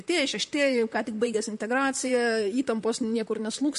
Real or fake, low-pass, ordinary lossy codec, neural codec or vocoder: fake; 14.4 kHz; MP3, 48 kbps; vocoder, 44.1 kHz, 128 mel bands, Pupu-Vocoder